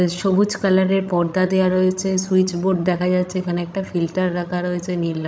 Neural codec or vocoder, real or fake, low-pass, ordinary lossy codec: codec, 16 kHz, 16 kbps, FreqCodec, larger model; fake; none; none